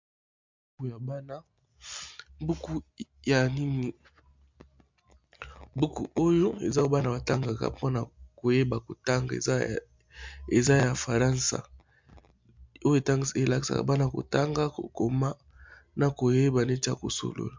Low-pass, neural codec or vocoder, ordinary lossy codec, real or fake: 7.2 kHz; none; MP3, 64 kbps; real